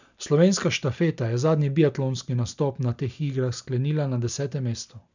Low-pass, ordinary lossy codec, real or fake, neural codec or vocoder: 7.2 kHz; none; real; none